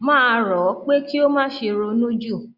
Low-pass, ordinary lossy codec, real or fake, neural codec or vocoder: 5.4 kHz; Opus, 64 kbps; real; none